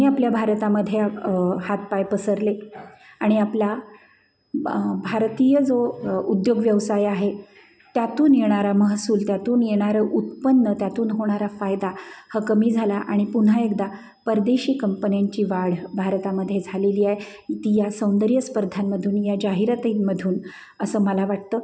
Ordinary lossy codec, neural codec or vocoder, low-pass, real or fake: none; none; none; real